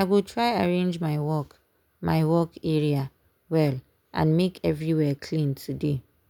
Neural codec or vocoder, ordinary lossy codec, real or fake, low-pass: none; none; real; 19.8 kHz